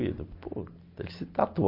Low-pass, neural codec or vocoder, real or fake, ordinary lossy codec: 5.4 kHz; none; real; MP3, 32 kbps